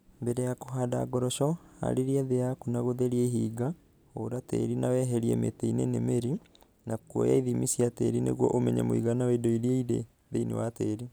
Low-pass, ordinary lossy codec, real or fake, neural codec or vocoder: none; none; real; none